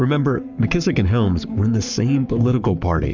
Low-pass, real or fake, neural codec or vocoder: 7.2 kHz; fake; codec, 44.1 kHz, 7.8 kbps, Pupu-Codec